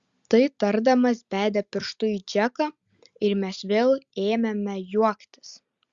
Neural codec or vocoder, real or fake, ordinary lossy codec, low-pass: none; real; Opus, 64 kbps; 7.2 kHz